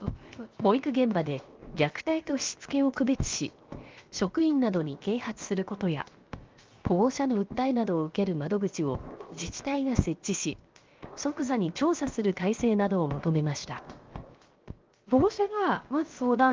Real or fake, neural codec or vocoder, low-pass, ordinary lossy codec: fake; codec, 16 kHz, 0.7 kbps, FocalCodec; 7.2 kHz; Opus, 32 kbps